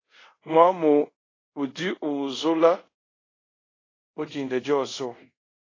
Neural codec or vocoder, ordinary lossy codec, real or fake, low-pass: codec, 24 kHz, 0.5 kbps, DualCodec; AAC, 32 kbps; fake; 7.2 kHz